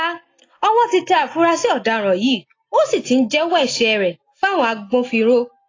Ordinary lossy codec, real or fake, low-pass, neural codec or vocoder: AAC, 32 kbps; real; 7.2 kHz; none